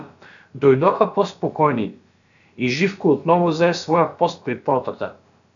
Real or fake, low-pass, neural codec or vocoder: fake; 7.2 kHz; codec, 16 kHz, about 1 kbps, DyCAST, with the encoder's durations